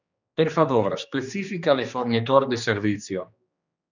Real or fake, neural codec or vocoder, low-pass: fake; codec, 16 kHz, 2 kbps, X-Codec, HuBERT features, trained on general audio; 7.2 kHz